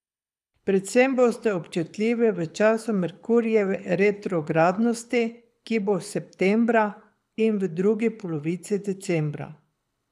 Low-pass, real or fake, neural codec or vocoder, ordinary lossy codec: none; fake; codec, 24 kHz, 6 kbps, HILCodec; none